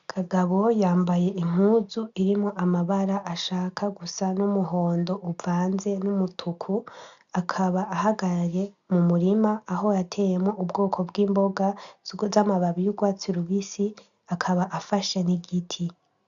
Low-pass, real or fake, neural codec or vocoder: 7.2 kHz; real; none